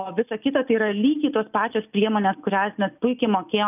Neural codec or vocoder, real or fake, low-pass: none; real; 3.6 kHz